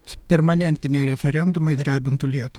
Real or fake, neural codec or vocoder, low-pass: fake; codec, 44.1 kHz, 2.6 kbps, DAC; 19.8 kHz